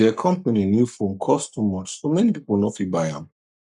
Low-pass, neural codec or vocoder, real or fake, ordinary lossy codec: 10.8 kHz; codec, 44.1 kHz, 7.8 kbps, Pupu-Codec; fake; none